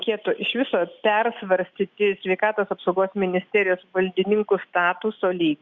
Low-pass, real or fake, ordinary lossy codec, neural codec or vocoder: 7.2 kHz; real; Opus, 64 kbps; none